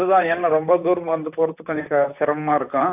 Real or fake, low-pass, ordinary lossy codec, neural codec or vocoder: fake; 3.6 kHz; AAC, 32 kbps; vocoder, 44.1 kHz, 128 mel bands, Pupu-Vocoder